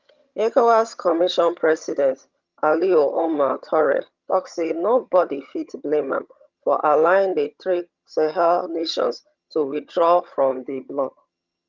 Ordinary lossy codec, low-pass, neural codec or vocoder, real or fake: Opus, 24 kbps; 7.2 kHz; vocoder, 22.05 kHz, 80 mel bands, HiFi-GAN; fake